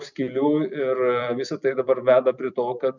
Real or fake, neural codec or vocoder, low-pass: fake; vocoder, 44.1 kHz, 128 mel bands every 256 samples, BigVGAN v2; 7.2 kHz